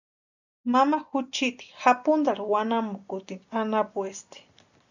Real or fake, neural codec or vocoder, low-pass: real; none; 7.2 kHz